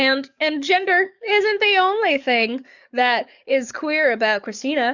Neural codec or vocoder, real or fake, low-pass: codec, 44.1 kHz, 7.8 kbps, DAC; fake; 7.2 kHz